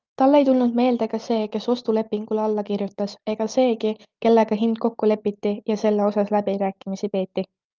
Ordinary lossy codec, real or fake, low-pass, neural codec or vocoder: Opus, 32 kbps; real; 7.2 kHz; none